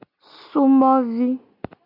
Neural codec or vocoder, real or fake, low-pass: none; real; 5.4 kHz